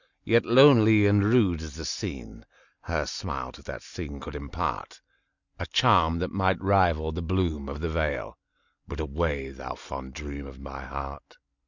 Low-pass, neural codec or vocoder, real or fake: 7.2 kHz; none; real